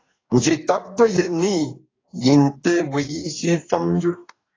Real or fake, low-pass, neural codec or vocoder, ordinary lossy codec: fake; 7.2 kHz; codec, 44.1 kHz, 2.6 kbps, DAC; AAC, 32 kbps